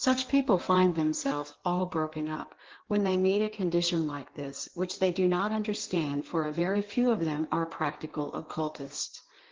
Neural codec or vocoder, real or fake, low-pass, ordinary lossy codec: codec, 16 kHz in and 24 kHz out, 1.1 kbps, FireRedTTS-2 codec; fake; 7.2 kHz; Opus, 16 kbps